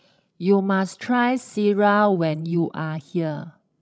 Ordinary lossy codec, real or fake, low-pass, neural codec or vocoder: none; fake; none; codec, 16 kHz, 16 kbps, FreqCodec, larger model